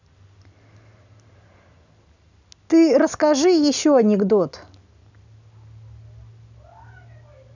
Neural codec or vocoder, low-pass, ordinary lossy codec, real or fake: none; 7.2 kHz; none; real